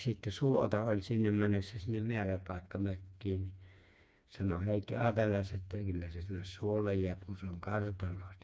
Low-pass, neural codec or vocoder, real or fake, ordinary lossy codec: none; codec, 16 kHz, 2 kbps, FreqCodec, smaller model; fake; none